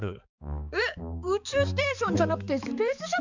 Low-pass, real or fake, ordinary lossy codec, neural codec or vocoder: 7.2 kHz; fake; none; codec, 16 kHz, 4 kbps, X-Codec, HuBERT features, trained on balanced general audio